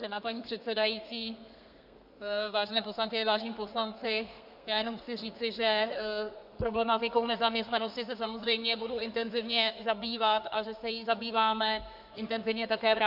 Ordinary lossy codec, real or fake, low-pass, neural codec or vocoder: MP3, 48 kbps; fake; 5.4 kHz; codec, 32 kHz, 1.9 kbps, SNAC